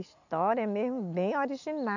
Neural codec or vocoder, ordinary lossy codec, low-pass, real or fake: none; none; 7.2 kHz; real